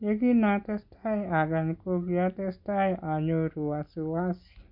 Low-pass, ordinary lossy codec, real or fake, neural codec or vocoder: 5.4 kHz; none; real; none